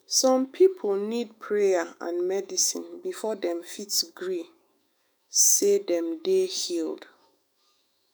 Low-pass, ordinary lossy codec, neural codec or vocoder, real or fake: none; none; autoencoder, 48 kHz, 128 numbers a frame, DAC-VAE, trained on Japanese speech; fake